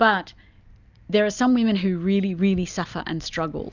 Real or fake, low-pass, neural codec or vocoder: real; 7.2 kHz; none